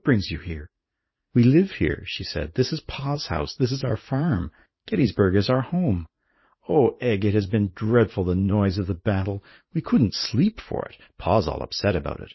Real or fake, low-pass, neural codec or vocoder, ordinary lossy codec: fake; 7.2 kHz; vocoder, 44.1 kHz, 80 mel bands, Vocos; MP3, 24 kbps